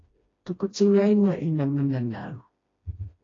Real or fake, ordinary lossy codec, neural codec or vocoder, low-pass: fake; AAC, 32 kbps; codec, 16 kHz, 1 kbps, FreqCodec, smaller model; 7.2 kHz